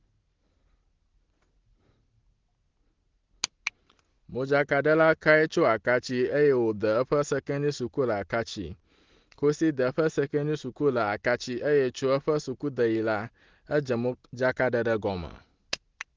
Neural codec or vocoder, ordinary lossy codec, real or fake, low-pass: none; Opus, 16 kbps; real; 7.2 kHz